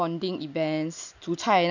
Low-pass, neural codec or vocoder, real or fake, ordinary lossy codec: 7.2 kHz; none; real; none